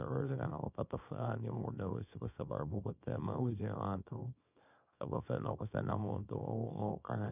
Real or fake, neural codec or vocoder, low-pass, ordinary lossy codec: fake; codec, 24 kHz, 0.9 kbps, WavTokenizer, small release; 3.6 kHz; none